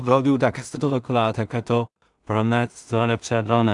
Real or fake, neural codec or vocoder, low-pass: fake; codec, 16 kHz in and 24 kHz out, 0.4 kbps, LongCat-Audio-Codec, two codebook decoder; 10.8 kHz